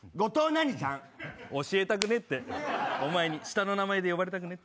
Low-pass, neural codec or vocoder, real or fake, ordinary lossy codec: none; none; real; none